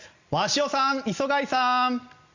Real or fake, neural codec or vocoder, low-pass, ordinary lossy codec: real; none; 7.2 kHz; Opus, 64 kbps